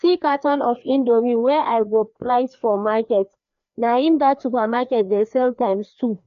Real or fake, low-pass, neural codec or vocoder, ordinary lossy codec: fake; 7.2 kHz; codec, 16 kHz, 2 kbps, FreqCodec, larger model; none